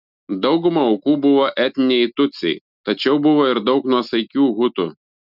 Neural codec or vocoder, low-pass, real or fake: none; 5.4 kHz; real